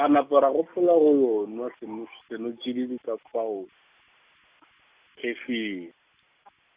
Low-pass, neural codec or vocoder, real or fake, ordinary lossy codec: 3.6 kHz; none; real; Opus, 64 kbps